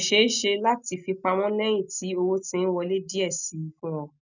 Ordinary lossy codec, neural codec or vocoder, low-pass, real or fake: none; none; 7.2 kHz; real